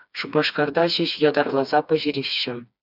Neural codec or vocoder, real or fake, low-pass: codec, 16 kHz, 2 kbps, FreqCodec, smaller model; fake; 5.4 kHz